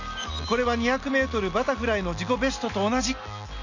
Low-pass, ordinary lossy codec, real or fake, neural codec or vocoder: 7.2 kHz; none; real; none